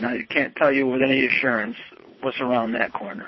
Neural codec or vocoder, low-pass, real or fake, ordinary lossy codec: vocoder, 22.05 kHz, 80 mel bands, WaveNeXt; 7.2 kHz; fake; MP3, 24 kbps